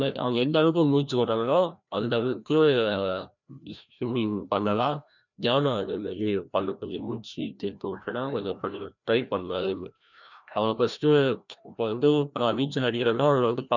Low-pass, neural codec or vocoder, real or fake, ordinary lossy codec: 7.2 kHz; codec, 16 kHz, 1 kbps, FreqCodec, larger model; fake; none